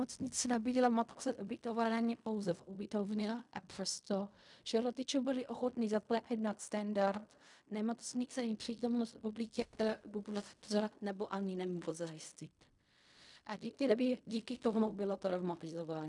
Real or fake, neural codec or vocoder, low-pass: fake; codec, 16 kHz in and 24 kHz out, 0.4 kbps, LongCat-Audio-Codec, fine tuned four codebook decoder; 10.8 kHz